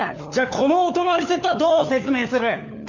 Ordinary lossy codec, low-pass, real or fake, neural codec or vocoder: none; 7.2 kHz; fake; codec, 16 kHz, 4 kbps, FunCodec, trained on LibriTTS, 50 frames a second